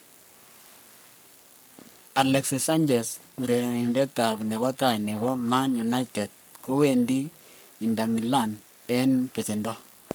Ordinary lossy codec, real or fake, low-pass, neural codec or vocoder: none; fake; none; codec, 44.1 kHz, 3.4 kbps, Pupu-Codec